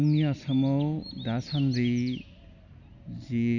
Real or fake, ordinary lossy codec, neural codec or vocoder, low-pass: real; none; none; 7.2 kHz